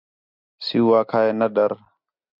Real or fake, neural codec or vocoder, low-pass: real; none; 5.4 kHz